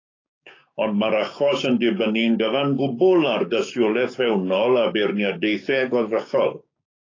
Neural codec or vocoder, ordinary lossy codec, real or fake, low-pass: codec, 16 kHz, 6 kbps, DAC; AAC, 32 kbps; fake; 7.2 kHz